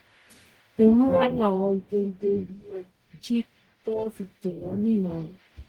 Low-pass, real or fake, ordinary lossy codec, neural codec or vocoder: 19.8 kHz; fake; Opus, 24 kbps; codec, 44.1 kHz, 0.9 kbps, DAC